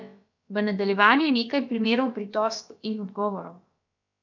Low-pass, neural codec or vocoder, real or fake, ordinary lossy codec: 7.2 kHz; codec, 16 kHz, about 1 kbps, DyCAST, with the encoder's durations; fake; none